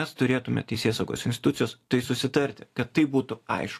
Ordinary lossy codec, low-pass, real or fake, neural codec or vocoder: AAC, 64 kbps; 14.4 kHz; fake; vocoder, 44.1 kHz, 128 mel bands every 256 samples, BigVGAN v2